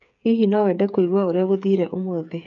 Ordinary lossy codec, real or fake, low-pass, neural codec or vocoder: none; fake; 7.2 kHz; codec, 16 kHz, 8 kbps, FreqCodec, smaller model